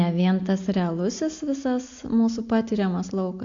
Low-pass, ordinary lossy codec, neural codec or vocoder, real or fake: 7.2 kHz; AAC, 48 kbps; none; real